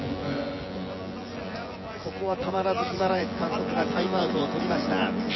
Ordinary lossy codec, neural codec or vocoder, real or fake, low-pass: MP3, 24 kbps; vocoder, 24 kHz, 100 mel bands, Vocos; fake; 7.2 kHz